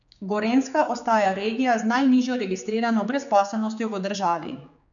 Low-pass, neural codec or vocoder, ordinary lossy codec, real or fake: 7.2 kHz; codec, 16 kHz, 4 kbps, X-Codec, HuBERT features, trained on general audio; none; fake